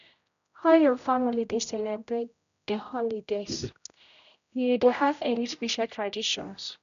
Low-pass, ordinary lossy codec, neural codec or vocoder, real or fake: 7.2 kHz; none; codec, 16 kHz, 0.5 kbps, X-Codec, HuBERT features, trained on general audio; fake